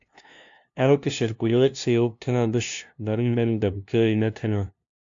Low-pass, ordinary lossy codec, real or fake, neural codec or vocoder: 7.2 kHz; AAC, 64 kbps; fake; codec, 16 kHz, 0.5 kbps, FunCodec, trained on LibriTTS, 25 frames a second